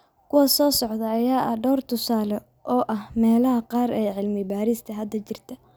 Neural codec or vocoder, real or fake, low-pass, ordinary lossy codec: none; real; none; none